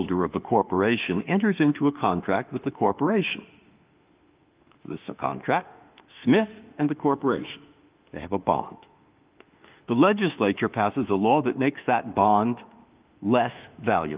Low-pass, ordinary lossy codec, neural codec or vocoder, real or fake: 3.6 kHz; Opus, 24 kbps; autoencoder, 48 kHz, 32 numbers a frame, DAC-VAE, trained on Japanese speech; fake